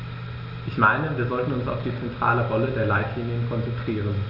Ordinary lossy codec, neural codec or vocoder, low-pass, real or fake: none; none; 5.4 kHz; real